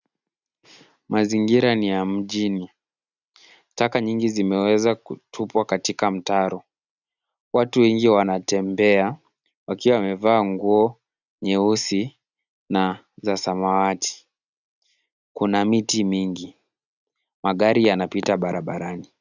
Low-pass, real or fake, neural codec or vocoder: 7.2 kHz; real; none